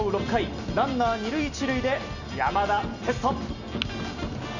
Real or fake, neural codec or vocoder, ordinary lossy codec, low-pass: real; none; none; 7.2 kHz